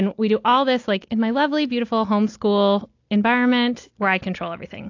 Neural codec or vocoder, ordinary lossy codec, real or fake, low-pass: none; AAC, 48 kbps; real; 7.2 kHz